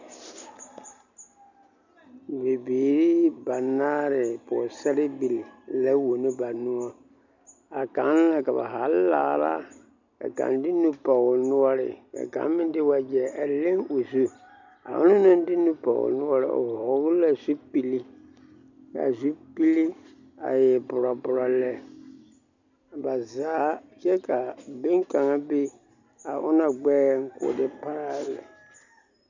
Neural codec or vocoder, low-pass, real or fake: none; 7.2 kHz; real